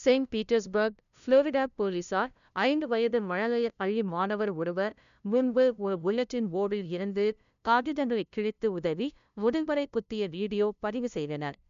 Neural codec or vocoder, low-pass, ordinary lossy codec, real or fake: codec, 16 kHz, 0.5 kbps, FunCodec, trained on LibriTTS, 25 frames a second; 7.2 kHz; none; fake